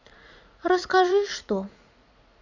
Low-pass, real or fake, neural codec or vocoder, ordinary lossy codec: 7.2 kHz; fake; vocoder, 44.1 kHz, 80 mel bands, Vocos; none